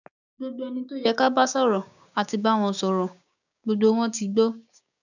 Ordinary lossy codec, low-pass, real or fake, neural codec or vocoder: none; 7.2 kHz; fake; codec, 16 kHz, 6 kbps, DAC